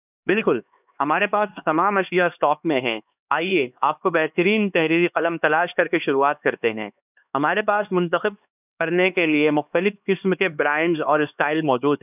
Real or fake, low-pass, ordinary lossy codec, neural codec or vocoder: fake; 3.6 kHz; none; codec, 16 kHz, 2 kbps, X-Codec, HuBERT features, trained on LibriSpeech